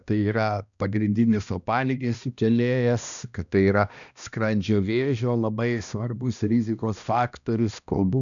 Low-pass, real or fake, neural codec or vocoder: 7.2 kHz; fake; codec, 16 kHz, 1 kbps, X-Codec, HuBERT features, trained on balanced general audio